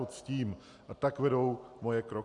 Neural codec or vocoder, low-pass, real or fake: none; 10.8 kHz; real